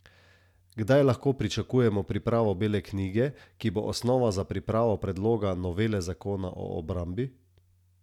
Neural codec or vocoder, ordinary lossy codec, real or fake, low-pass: none; none; real; 19.8 kHz